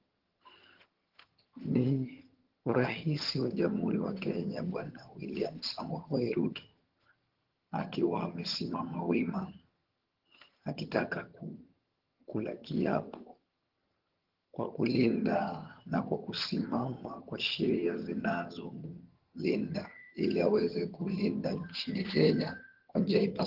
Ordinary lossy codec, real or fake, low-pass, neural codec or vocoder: Opus, 16 kbps; fake; 5.4 kHz; vocoder, 22.05 kHz, 80 mel bands, HiFi-GAN